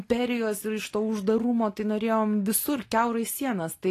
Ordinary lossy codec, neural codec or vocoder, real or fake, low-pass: AAC, 48 kbps; none; real; 14.4 kHz